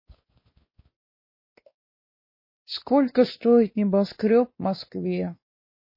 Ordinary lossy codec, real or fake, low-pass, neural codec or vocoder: MP3, 24 kbps; fake; 5.4 kHz; codec, 16 kHz, 2 kbps, X-Codec, WavLM features, trained on Multilingual LibriSpeech